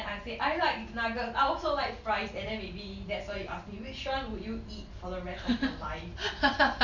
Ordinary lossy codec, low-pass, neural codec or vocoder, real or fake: none; 7.2 kHz; none; real